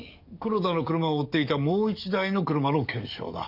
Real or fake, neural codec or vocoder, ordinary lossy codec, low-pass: fake; codec, 44.1 kHz, 7.8 kbps, DAC; none; 5.4 kHz